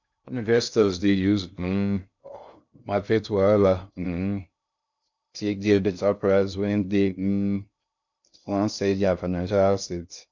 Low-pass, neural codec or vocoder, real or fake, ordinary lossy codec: 7.2 kHz; codec, 16 kHz in and 24 kHz out, 0.6 kbps, FocalCodec, streaming, 2048 codes; fake; none